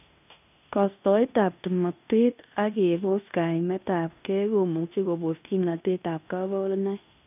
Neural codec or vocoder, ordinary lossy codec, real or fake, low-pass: codec, 16 kHz in and 24 kHz out, 0.9 kbps, LongCat-Audio-Codec, fine tuned four codebook decoder; none; fake; 3.6 kHz